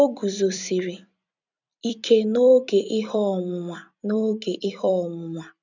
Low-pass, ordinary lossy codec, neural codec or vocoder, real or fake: 7.2 kHz; none; vocoder, 44.1 kHz, 128 mel bands every 256 samples, BigVGAN v2; fake